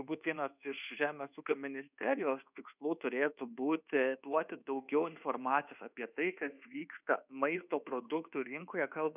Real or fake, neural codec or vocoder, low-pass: fake; codec, 24 kHz, 1.2 kbps, DualCodec; 3.6 kHz